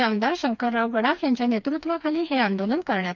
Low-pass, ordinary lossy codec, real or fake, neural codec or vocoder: 7.2 kHz; none; fake; codec, 16 kHz, 2 kbps, FreqCodec, smaller model